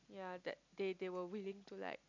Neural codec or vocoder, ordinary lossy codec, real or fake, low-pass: none; MP3, 64 kbps; real; 7.2 kHz